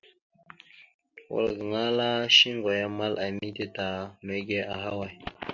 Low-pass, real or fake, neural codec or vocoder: 7.2 kHz; real; none